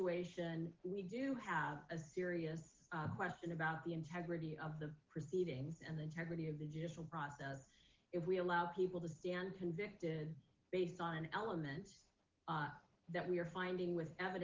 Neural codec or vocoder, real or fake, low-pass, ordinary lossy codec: none; real; 7.2 kHz; Opus, 16 kbps